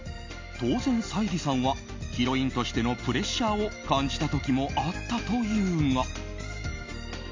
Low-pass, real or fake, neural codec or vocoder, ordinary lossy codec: 7.2 kHz; real; none; MP3, 64 kbps